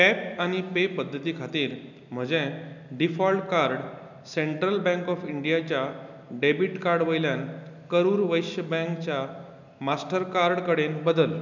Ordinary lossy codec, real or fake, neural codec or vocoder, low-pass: none; real; none; 7.2 kHz